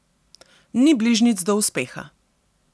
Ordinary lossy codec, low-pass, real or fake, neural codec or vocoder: none; none; real; none